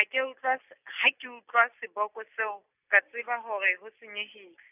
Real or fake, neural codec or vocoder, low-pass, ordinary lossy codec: real; none; 3.6 kHz; none